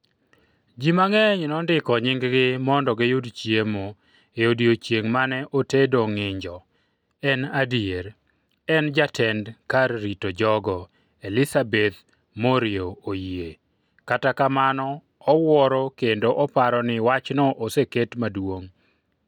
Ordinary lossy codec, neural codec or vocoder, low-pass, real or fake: none; none; 19.8 kHz; real